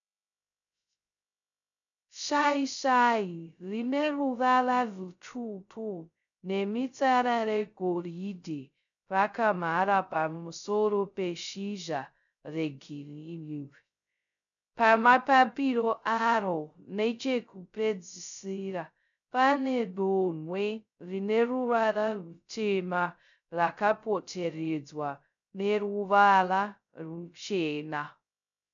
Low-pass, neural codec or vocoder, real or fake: 7.2 kHz; codec, 16 kHz, 0.2 kbps, FocalCodec; fake